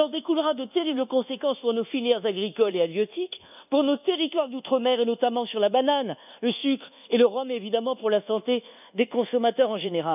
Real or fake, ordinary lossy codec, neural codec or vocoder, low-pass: fake; none; codec, 24 kHz, 1.2 kbps, DualCodec; 3.6 kHz